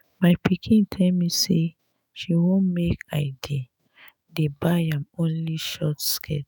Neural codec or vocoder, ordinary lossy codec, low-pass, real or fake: autoencoder, 48 kHz, 128 numbers a frame, DAC-VAE, trained on Japanese speech; none; none; fake